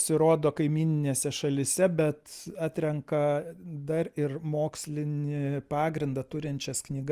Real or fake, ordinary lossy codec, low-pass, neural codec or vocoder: real; Opus, 24 kbps; 14.4 kHz; none